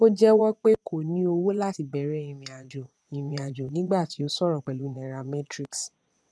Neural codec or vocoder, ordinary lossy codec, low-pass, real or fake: vocoder, 22.05 kHz, 80 mel bands, Vocos; none; none; fake